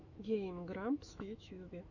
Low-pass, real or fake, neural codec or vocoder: 7.2 kHz; fake; autoencoder, 48 kHz, 128 numbers a frame, DAC-VAE, trained on Japanese speech